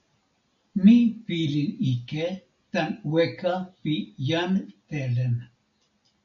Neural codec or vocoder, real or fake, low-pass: none; real; 7.2 kHz